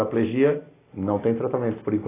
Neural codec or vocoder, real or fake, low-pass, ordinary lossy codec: none; real; 3.6 kHz; AAC, 16 kbps